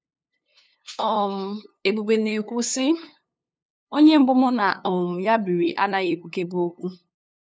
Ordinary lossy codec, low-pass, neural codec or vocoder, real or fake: none; none; codec, 16 kHz, 2 kbps, FunCodec, trained on LibriTTS, 25 frames a second; fake